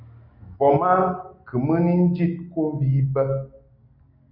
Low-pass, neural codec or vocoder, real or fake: 5.4 kHz; none; real